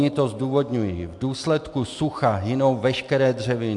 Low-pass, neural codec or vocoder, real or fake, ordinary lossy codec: 10.8 kHz; none; real; MP3, 64 kbps